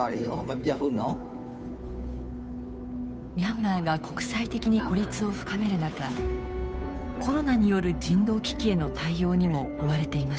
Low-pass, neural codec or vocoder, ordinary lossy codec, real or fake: none; codec, 16 kHz, 2 kbps, FunCodec, trained on Chinese and English, 25 frames a second; none; fake